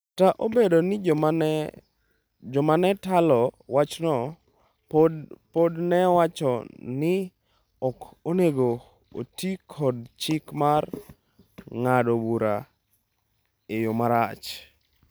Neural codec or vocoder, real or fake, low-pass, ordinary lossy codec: none; real; none; none